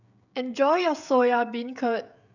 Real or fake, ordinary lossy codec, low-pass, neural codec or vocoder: fake; none; 7.2 kHz; codec, 16 kHz, 16 kbps, FreqCodec, smaller model